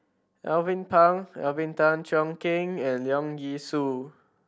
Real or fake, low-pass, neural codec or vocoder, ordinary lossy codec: real; none; none; none